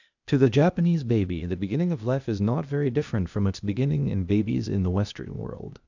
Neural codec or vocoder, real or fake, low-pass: codec, 16 kHz, 0.8 kbps, ZipCodec; fake; 7.2 kHz